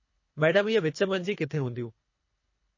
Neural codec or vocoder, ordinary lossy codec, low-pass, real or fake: codec, 24 kHz, 3 kbps, HILCodec; MP3, 32 kbps; 7.2 kHz; fake